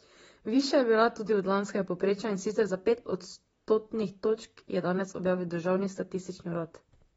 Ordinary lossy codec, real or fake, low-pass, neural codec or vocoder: AAC, 24 kbps; fake; 19.8 kHz; vocoder, 44.1 kHz, 128 mel bands, Pupu-Vocoder